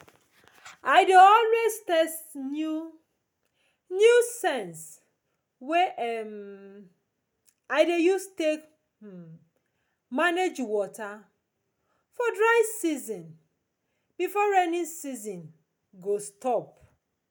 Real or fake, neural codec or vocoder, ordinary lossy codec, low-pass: real; none; none; none